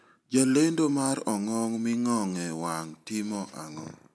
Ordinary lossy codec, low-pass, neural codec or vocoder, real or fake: none; none; none; real